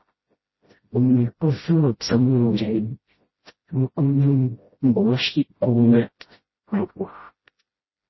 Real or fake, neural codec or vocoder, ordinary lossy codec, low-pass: fake; codec, 16 kHz, 0.5 kbps, FreqCodec, smaller model; MP3, 24 kbps; 7.2 kHz